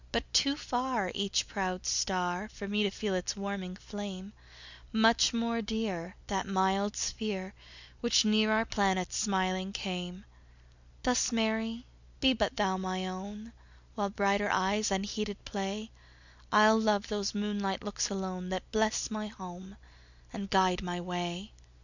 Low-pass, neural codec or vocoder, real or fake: 7.2 kHz; none; real